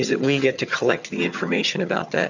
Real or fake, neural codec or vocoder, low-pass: fake; vocoder, 22.05 kHz, 80 mel bands, HiFi-GAN; 7.2 kHz